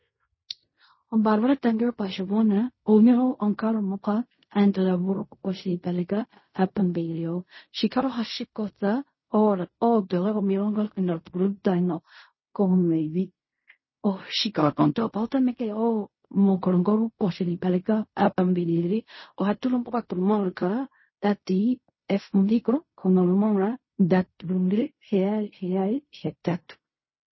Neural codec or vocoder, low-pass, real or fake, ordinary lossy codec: codec, 16 kHz in and 24 kHz out, 0.4 kbps, LongCat-Audio-Codec, fine tuned four codebook decoder; 7.2 kHz; fake; MP3, 24 kbps